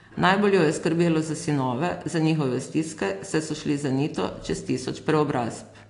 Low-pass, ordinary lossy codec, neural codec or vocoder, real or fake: 10.8 kHz; AAC, 48 kbps; none; real